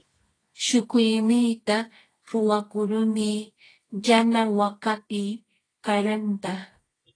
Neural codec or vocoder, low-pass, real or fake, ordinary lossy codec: codec, 24 kHz, 0.9 kbps, WavTokenizer, medium music audio release; 9.9 kHz; fake; AAC, 32 kbps